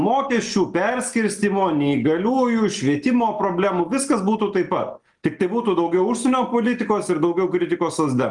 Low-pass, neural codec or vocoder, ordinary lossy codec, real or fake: 10.8 kHz; none; Opus, 32 kbps; real